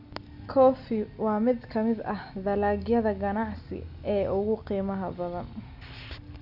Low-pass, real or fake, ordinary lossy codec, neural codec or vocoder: 5.4 kHz; real; none; none